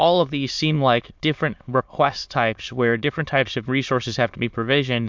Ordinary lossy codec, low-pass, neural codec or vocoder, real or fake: MP3, 64 kbps; 7.2 kHz; autoencoder, 22.05 kHz, a latent of 192 numbers a frame, VITS, trained on many speakers; fake